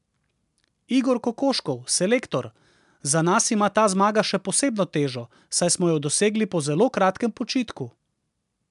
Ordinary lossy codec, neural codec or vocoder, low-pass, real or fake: MP3, 96 kbps; none; 10.8 kHz; real